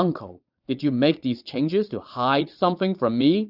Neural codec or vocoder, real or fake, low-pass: vocoder, 22.05 kHz, 80 mel bands, Vocos; fake; 5.4 kHz